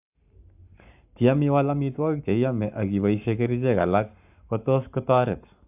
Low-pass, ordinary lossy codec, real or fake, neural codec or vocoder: 3.6 kHz; AAC, 32 kbps; fake; codec, 44.1 kHz, 7.8 kbps, Pupu-Codec